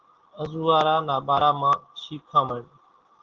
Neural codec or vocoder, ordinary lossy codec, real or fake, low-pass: none; Opus, 16 kbps; real; 7.2 kHz